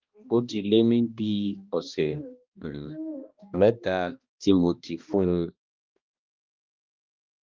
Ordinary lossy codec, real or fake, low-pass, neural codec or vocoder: Opus, 24 kbps; fake; 7.2 kHz; codec, 16 kHz, 1 kbps, X-Codec, HuBERT features, trained on balanced general audio